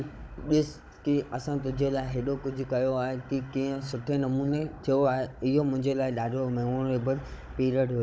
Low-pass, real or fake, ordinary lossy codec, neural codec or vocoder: none; fake; none; codec, 16 kHz, 16 kbps, FunCodec, trained on Chinese and English, 50 frames a second